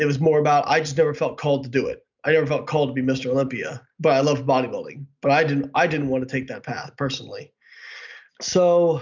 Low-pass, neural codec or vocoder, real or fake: 7.2 kHz; none; real